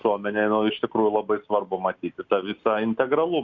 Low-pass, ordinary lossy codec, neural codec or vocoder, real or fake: 7.2 kHz; Opus, 64 kbps; none; real